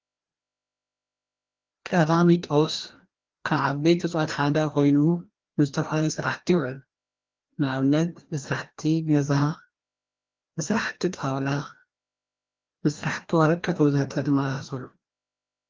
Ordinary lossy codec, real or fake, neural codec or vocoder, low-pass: Opus, 16 kbps; fake; codec, 16 kHz, 1 kbps, FreqCodec, larger model; 7.2 kHz